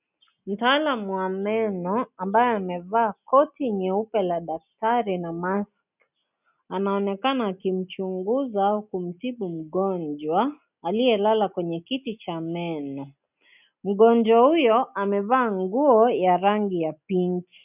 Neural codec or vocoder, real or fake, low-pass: none; real; 3.6 kHz